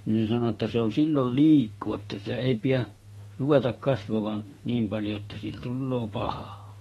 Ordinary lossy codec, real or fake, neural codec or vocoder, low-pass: AAC, 32 kbps; fake; autoencoder, 48 kHz, 32 numbers a frame, DAC-VAE, trained on Japanese speech; 19.8 kHz